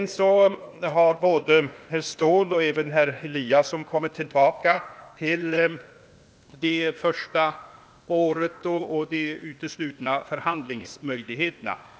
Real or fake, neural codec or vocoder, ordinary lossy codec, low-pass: fake; codec, 16 kHz, 0.8 kbps, ZipCodec; none; none